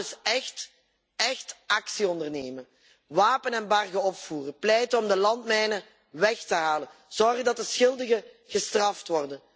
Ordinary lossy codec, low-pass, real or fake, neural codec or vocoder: none; none; real; none